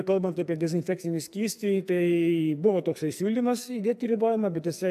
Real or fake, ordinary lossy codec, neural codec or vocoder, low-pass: fake; AAC, 96 kbps; codec, 44.1 kHz, 2.6 kbps, SNAC; 14.4 kHz